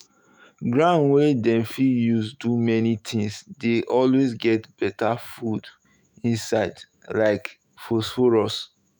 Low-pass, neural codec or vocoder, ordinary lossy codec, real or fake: none; autoencoder, 48 kHz, 128 numbers a frame, DAC-VAE, trained on Japanese speech; none; fake